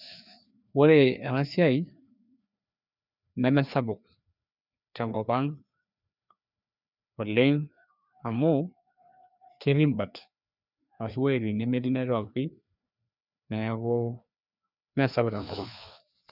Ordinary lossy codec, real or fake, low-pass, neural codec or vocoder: none; fake; 5.4 kHz; codec, 16 kHz, 2 kbps, FreqCodec, larger model